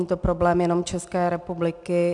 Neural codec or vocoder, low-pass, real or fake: none; 10.8 kHz; real